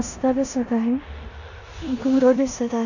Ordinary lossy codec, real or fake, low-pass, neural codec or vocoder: none; fake; 7.2 kHz; codec, 16 kHz in and 24 kHz out, 0.9 kbps, LongCat-Audio-Codec, four codebook decoder